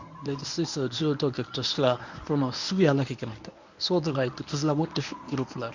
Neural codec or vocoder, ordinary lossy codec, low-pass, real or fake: codec, 24 kHz, 0.9 kbps, WavTokenizer, medium speech release version 1; none; 7.2 kHz; fake